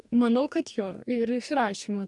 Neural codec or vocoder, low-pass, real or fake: codec, 44.1 kHz, 2.6 kbps, DAC; 10.8 kHz; fake